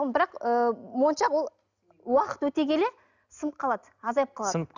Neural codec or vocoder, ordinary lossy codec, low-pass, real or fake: none; none; 7.2 kHz; real